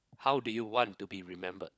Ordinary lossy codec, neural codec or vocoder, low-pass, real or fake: none; codec, 16 kHz, 16 kbps, FunCodec, trained on LibriTTS, 50 frames a second; none; fake